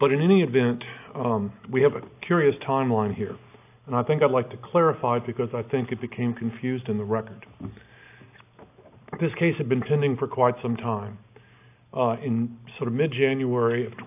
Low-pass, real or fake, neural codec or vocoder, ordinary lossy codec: 3.6 kHz; real; none; AAC, 32 kbps